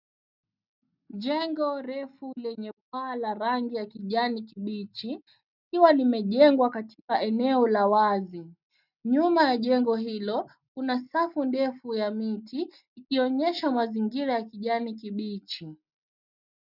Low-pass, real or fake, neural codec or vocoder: 5.4 kHz; real; none